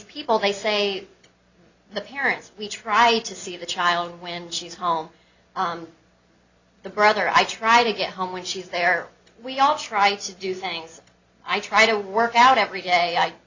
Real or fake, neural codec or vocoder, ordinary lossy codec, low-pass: real; none; Opus, 64 kbps; 7.2 kHz